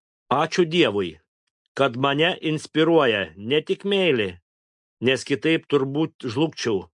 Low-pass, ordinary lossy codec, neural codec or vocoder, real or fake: 10.8 kHz; MP3, 64 kbps; none; real